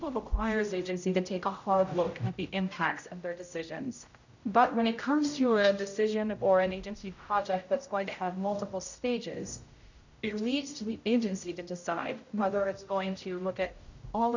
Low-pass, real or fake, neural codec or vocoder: 7.2 kHz; fake; codec, 16 kHz, 0.5 kbps, X-Codec, HuBERT features, trained on general audio